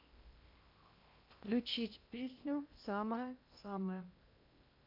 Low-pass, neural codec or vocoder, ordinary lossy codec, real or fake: 5.4 kHz; codec, 16 kHz in and 24 kHz out, 0.8 kbps, FocalCodec, streaming, 65536 codes; MP3, 48 kbps; fake